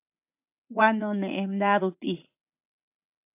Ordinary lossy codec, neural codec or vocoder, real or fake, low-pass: AAC, 32 kbps; vocoder, 44.1 kHz, 80 mel bands, Vocos; fake; 3.6 kHz